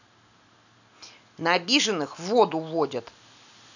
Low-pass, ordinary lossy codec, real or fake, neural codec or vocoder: 7.2 kHz; none; real; none